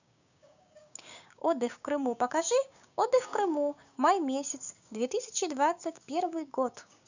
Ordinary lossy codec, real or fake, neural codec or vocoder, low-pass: none; fake; codec, 16 kHz, 6 kbps, DAC; 7.2 kHz